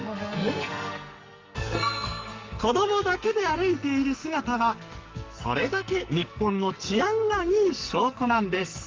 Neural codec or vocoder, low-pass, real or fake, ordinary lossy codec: codec, 44.1 kHz, 2.6 kbps, SNAC; 7.2 kHz; fake; Opus, 32 kbps